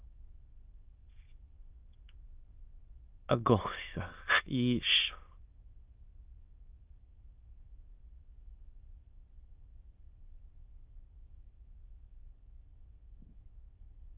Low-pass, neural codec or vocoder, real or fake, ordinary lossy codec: 3.6 kHz; autoencoder, 22.05 kHz, a latent of 192 numbers a frame, VITS, trained on many speakers; fake; Opus, 24 kbps